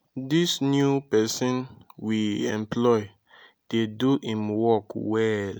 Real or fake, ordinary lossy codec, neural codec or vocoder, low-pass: real; none; none; none